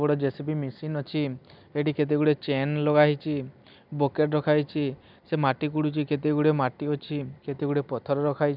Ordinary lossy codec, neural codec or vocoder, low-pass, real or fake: none; none; 5.4 kHz; real